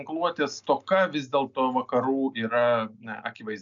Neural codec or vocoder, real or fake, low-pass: none; real; 7.2 kHz